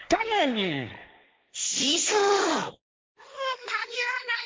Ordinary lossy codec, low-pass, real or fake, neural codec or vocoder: none; none; fake; codec, 16 kHz, 1.1 kbps, Voila-Tokenizer